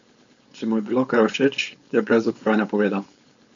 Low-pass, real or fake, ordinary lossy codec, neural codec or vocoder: 7.2 kHz; fake; MP3, 64 kbps; codec, 16 kHz, 4.8 kbps, FACodec